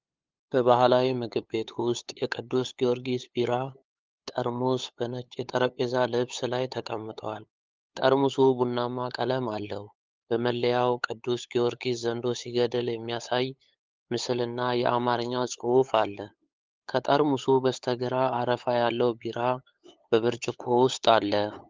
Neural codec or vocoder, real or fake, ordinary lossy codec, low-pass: codec, 16 kHz, 8 kbps, FunCodec, trained on LibriTTS, 25 frames a second; fake; Opus, 24 kbps; 7.2 kHz